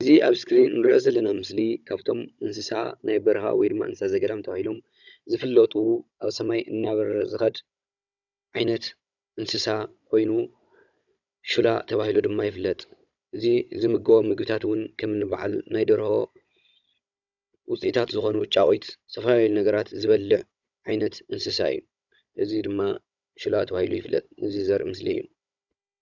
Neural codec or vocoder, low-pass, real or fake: codec, 16 kHz, 16 kbps, FunCodec, trained on Chinese and English, 50 frames a second; 7.2 kHz; fake